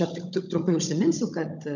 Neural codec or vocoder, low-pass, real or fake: codec, 16 kHz, 16 kbps, FunCodec, trained on Chinese and English, 50 frames a second; 7.2 kHz; fake